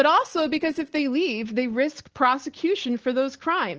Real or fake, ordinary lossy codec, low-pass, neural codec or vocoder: real; Opus, 16 kbps; 7.2 kHz; none